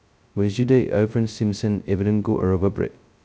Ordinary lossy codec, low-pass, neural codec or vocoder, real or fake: none; none; codec, 16 kHz, 0.2 kbps, FocalCodec; fake